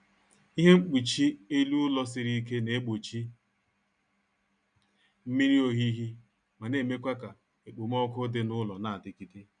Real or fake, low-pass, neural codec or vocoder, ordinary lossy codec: real; 9.9 kHz; none; none